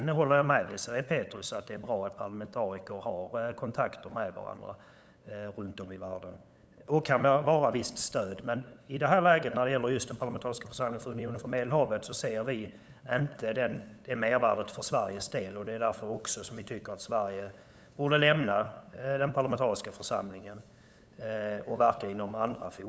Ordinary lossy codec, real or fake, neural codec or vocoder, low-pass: none; fake; codec, 16 kHz, 8 kbps, FunCodec, trained on LibriTTS, 25 frames a second; none